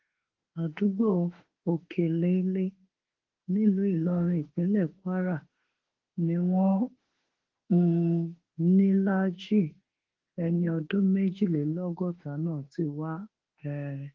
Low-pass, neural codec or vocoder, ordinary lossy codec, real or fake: 7.2 kHz; autoencoder, 48 kHz, 32 numbers a frame, DAC-VAE, trained on Japanese speech; Opus, 16 kbps; fake